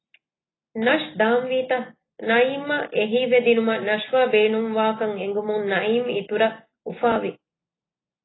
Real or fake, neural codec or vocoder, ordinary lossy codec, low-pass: real; none; AAC, 16 kbps; 7.2 kHz